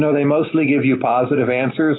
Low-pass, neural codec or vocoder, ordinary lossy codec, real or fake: 7.2 kHz; none; AAC, 16 kbps; real